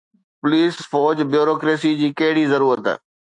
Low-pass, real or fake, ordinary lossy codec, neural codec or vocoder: 10.8 kHz; fake; AAC, 64 kbps; autoencoder, 48 kHz, 128 numbers a frame, DAC-VAE, trained on Japanese speech